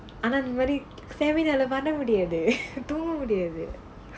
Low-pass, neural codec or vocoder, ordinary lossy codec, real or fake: none; none; none; real